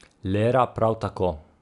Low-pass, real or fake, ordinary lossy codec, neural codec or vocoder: 10.8 kHz; real; none; none